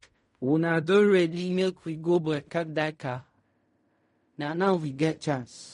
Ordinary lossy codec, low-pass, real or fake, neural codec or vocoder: MP3, 48 kbps; 10.8 kHz; fake; codec, 16 kHz in and 24 kHz out, 0.4 kbps, LongCat-Audio-Codec, fine tuned four codebook decoder